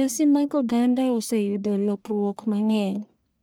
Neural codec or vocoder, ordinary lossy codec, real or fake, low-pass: codec, 44.1 kHz, 1.7 kbps, Pupu-Codec; none; fake; none